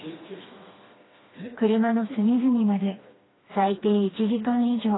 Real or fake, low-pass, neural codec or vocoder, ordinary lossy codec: fake; 7.2 kHz; codec, 16 kHz, 2 kbps, FreqCodec, smaller model; AAC, 16 kbps